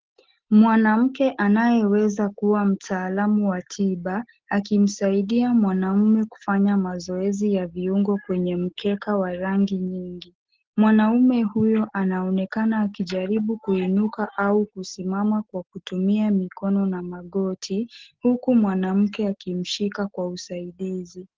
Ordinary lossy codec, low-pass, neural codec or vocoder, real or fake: Opus, 16 kbps; 7.2 kHz; none; real